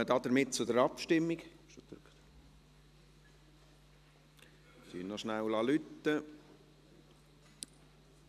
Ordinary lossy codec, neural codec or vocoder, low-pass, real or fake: none; none; 14.4 kHz; real